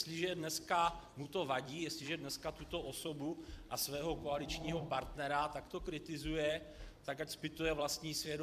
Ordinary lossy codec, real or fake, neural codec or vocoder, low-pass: AAC, 96 kbps; fake; vocoder, 44.1 kHz, 128 mel bands every 256 samples, BigVGAN v2; 14.4 kHz